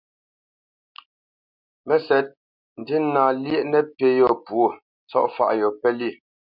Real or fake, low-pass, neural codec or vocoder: real; 5.4 kHz; none